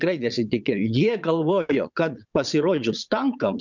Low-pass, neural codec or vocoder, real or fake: 7.2 kHz; vocoder, 22.05 kHz, 80 mel bands, WaveNeXt; fake